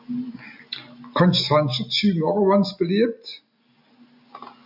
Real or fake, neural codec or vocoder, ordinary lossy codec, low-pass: real; none; AAC, 48 kbps; 5.4 kHz